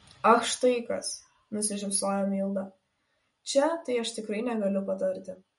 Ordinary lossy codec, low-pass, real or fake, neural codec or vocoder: MP3, 48 kbps; 19.8 kHz; real; none